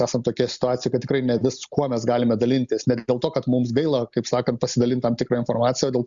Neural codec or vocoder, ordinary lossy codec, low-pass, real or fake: none; Opus, 64 kbps; 7.2 kHz; real